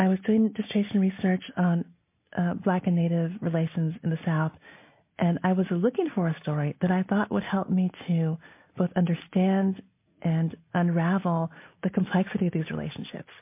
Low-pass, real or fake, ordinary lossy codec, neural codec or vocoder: 3.6 kHz; real; MP3, 24 kbps; none